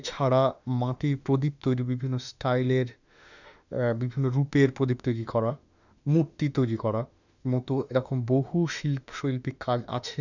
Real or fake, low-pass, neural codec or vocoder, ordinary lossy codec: fake; 7.2 kHz; autoencoder, 48 kHz, 32 numbers a frame, DAC-VAE, trained on Japanese speech; none